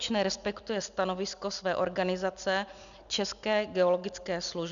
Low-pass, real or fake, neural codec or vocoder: 7.2 kHz; real; none